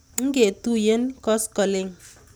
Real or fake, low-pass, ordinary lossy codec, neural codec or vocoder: real; none; none; none